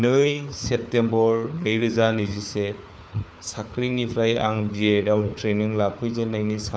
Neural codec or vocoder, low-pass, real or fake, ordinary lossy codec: codec, 16 kHz, 4 kbps, FunCodec, trained on Chinese and English, 50 frames a second; none; fake; none